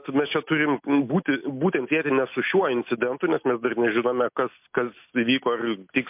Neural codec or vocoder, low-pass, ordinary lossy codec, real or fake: none; 3.6 kHz; MP3, 32 kbps; real